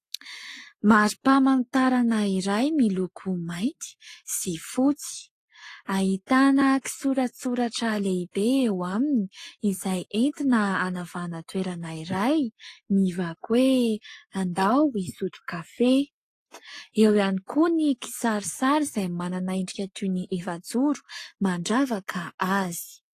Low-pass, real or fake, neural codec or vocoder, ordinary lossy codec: 14.4 kHz; fake; codec, 44.1 kHz, 7.8 kbps, Pupu-Codec; AAC, 48 kbps